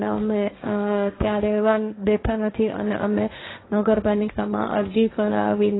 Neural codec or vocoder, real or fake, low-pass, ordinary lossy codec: codec, 16 kHz, 1.1 kbps, Voila-Tokenizer; fake; 7.2 kHz; AAC, 16 kbps